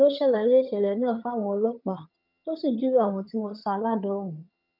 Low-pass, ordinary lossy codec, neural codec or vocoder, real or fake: 5.4 kHz; none; vocoder, 22.05 kHz, 80 mel bands, HiFi-GAN; fake